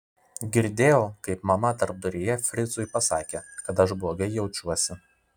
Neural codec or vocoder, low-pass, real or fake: none; 19.8 kHz; real